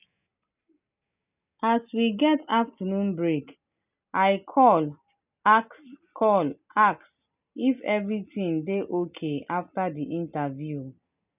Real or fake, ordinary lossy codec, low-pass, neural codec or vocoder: real; none; 3.6 kHz; none